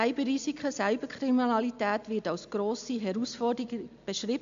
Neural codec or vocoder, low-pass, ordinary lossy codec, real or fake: none; 7.2 kHz; MP3, 64 kbps; real